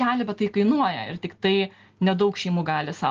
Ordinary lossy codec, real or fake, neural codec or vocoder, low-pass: Opus, 16 kbps; real; none; 7.2 kHz